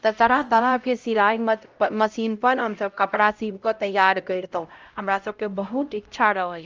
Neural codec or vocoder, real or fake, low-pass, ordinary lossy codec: codec, 16 kHz, 0.5 kbps, X-Codec, HuBERT features, trained on LibriSpeech; fake; 7.2 kHz; Opus, 24 kbps